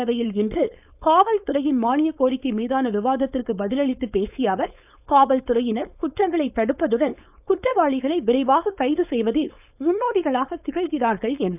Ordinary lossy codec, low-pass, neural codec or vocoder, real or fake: none; 3.6 kHz; codec, 16 kHz, 4.8 kbps, FACodec; fake